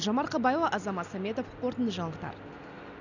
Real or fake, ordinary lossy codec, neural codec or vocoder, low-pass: real; none; none; 7.2 kHz